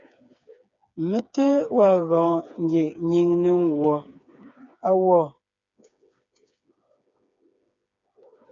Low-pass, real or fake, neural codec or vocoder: 7.2 kHz; fake; codec, 16 kHz, 4 kbps, FreqCodec, smaller model